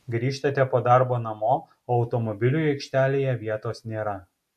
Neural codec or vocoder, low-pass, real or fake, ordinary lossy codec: none; 14.4 kHz; real; AAC, 96 kbps